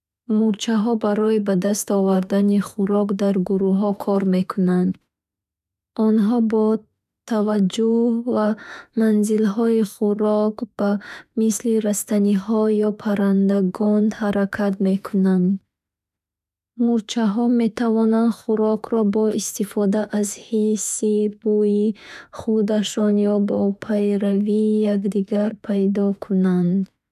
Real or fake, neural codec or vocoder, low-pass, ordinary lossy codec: fake; autoencoder, 48 kHz, 32 numbers a frame, DAC-VAE, trained on Japanese speech; 14.4 kHz; none